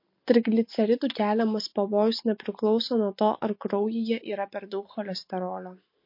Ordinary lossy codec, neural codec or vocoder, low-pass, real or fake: MP3, 32 kbps; none; 5.4 kHz; real